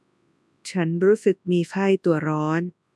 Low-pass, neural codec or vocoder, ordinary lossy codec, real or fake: none; codec, 24 kHz, 0.9 kbps, WavTokenizer, large speech release; none; fake